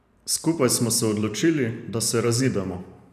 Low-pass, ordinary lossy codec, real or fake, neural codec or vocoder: 14.4 kHz; none; fake; vocoder, 44.1 kHz, 128 mel bands every 512 samples, BigVGAN v2